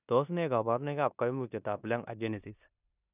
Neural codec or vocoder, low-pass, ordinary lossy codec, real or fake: codec, 16 kHz in and 24 kHz out, 0.9 kbps, LongCat-Audio-Codec, four codebook decoder; 3.6 kHz; none; fake